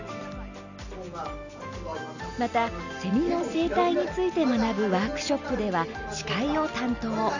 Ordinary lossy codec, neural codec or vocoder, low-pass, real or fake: none; none; 7.2 kHz; real